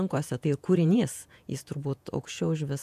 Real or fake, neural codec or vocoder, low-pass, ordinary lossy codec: fake; vocoder, 48 kHz, 128 mel bands, Vocos; 14.4 kHz; AAC, 96 kbps